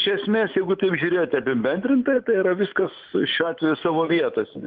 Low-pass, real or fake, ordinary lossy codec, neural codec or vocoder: 7.2 kHz; fake; Opus, 32 kbps; autoencoder, 48 kHz, 128 numbers a frame, DAC-VAE, trained on Japanese speech